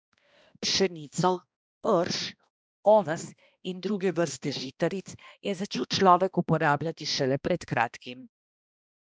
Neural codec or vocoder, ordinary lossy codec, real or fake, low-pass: codec, 16 kHz, 1 kbps, X-Codec, HuBERT features, trained on balanced general audio; none; fake; none